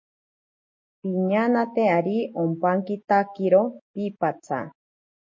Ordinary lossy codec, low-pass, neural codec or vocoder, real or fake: MP3, 32 kbps; 7.2 kHz; none; real